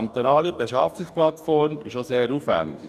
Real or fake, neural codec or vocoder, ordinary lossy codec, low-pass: fake; codec, 44.1 kHz, 2.6 kbps, DAC; none; 14.4 kHz